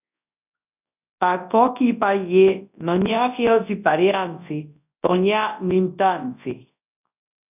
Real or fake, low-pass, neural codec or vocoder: fake; 3.6 kHz; codec, 24 kHz, 0.9 kbps, WavTokenizer, large speech release